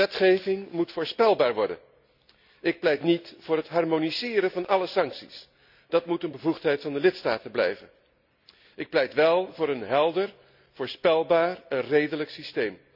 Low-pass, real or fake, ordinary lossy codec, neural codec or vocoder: 5.4 kHz; real; none; none